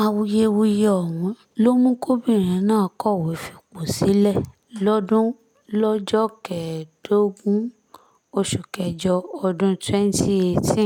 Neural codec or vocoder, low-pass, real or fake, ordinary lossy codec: none; 19.8 kHz; real; none